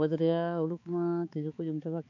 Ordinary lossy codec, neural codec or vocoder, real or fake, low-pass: MP3, 48 kbps; codec, 16 kHz, 4 kbps, X-Codec, HuBERT features, trained on balanced general audio; fake; 7.2 kHz